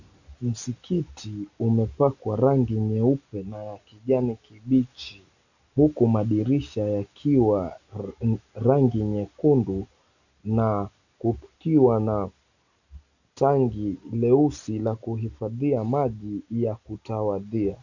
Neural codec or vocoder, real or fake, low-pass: none; real; 7.2 kHz